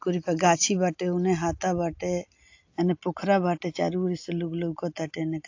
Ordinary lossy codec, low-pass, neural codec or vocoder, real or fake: AAC, 48 kbps; 7.2 kHz; none; real